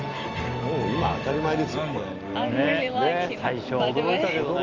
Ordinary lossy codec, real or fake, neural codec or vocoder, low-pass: Opus, 32 kbps; real; none; 7.2 kHz